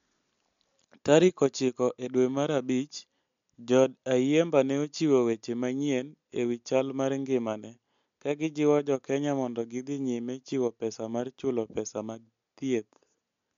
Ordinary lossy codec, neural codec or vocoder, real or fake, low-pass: MP3, 64 kbps; none; real; 7.2 kHz